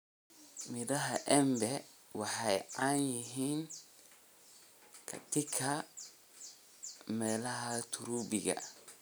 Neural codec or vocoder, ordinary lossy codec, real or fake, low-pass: none; none; real; none